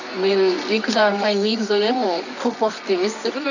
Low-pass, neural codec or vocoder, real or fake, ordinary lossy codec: 7.2 kHz; codec, 24 kHz, 0.9 kbps, WavTokenizer, medium music audio release; fake; none